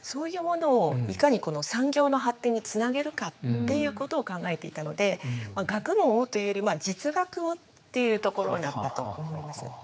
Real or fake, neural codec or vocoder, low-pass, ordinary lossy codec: fake; codec, 16 kHz, 4 kbps, X-Codec, HuBERT features, trained on general audio; none; none